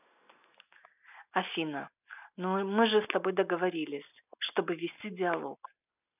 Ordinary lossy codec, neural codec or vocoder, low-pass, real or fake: none; none; 3.6 kHz; real